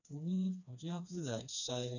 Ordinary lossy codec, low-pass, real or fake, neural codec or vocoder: none; 7.2 kHz; fake; codec, 16 kHz, 2 kbps, FreqCodec, smaller model